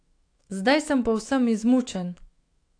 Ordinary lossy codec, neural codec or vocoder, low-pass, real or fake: AAC, 48 kbps; autoencoder, 48 kHz, 128 numbers a frame, DAC-VAE, trained on Japanese speech; 9.9 kHz; fake